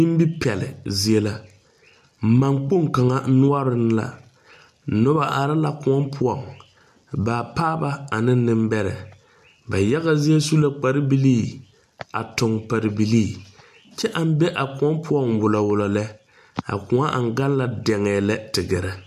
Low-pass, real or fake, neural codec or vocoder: 14.4 kHz; real; none